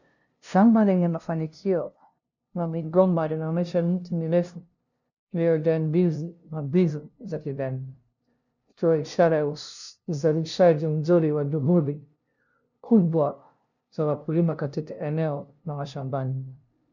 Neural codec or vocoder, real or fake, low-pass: codec, 16 kHz, 0.5 kbps, FunCodec, trained on LibriTTS, 25 frames a second; fake; 7.2 kHz